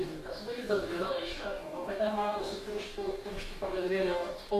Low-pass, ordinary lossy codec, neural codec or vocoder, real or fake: 14.4 kHz; MP3, 96 kbps; codec, 44.1 kHz, 2.6 kbps, DAC; fake